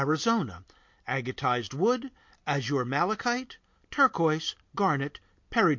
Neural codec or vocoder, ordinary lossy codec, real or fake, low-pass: none; MP3, 48 kbps; real; 7.2 kHz